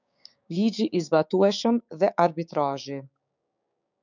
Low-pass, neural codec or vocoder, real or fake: 7.2 kHz; codec, 16 kHz, 6 kbps, DAC; fake